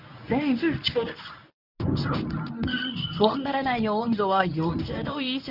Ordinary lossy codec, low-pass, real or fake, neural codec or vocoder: none; 5.4 kHz; fake; codec, 24 kHz, 0.9 kbps, WavTokenizer, medium speech release version 1